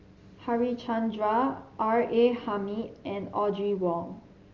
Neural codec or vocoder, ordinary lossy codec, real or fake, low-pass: none; Opus, 32 kbps; real; 7.2 kHz